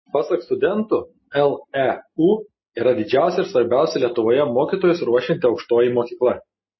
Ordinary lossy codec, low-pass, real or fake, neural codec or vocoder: MP3, 24 kbps; 7.2 kHz; real; none